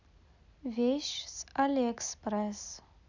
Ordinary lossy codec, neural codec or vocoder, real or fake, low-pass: none; none; real; 7.2 kHz